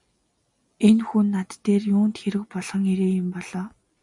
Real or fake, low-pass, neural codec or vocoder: real; 10.8 kHz; none